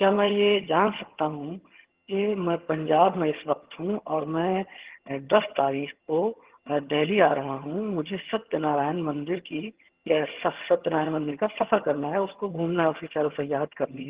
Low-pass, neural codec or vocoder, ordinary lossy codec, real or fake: 3.6 kHz; vocoder, 22.05 kHz, 80 mel bands, HiFi-GAN; Opus, 16 kbps; fake